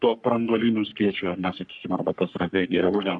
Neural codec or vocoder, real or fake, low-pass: codec, 44.1 kHz, 3.4 kbps, Pupu-Codec; fake; 10.8 kHz